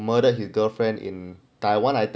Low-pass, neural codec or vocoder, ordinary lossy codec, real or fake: none; none; none; real